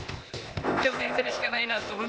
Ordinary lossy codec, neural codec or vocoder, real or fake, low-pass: none; codec, 16 kHz, 0.8 kbps, ZipCodec; fake; none